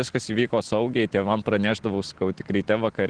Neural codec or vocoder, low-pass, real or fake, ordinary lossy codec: none; 9.9 kHz; real; Opus, 16 kbps